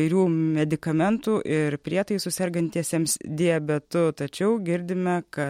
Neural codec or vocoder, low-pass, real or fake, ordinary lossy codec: none; 19.8 kHz; real; MP3, 64 kbps